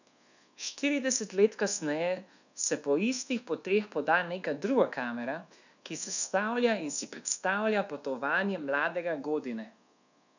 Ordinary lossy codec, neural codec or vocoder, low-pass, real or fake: none; codec, 24 kHz, 1.2 kbps, DualCodec; 7.2 kHz; fake